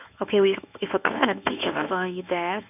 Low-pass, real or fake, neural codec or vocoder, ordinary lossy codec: 3.6 kHz; fake; codec, 24 kHz, 0.9 kbps, WavTokenizer, medium speech release version 1; none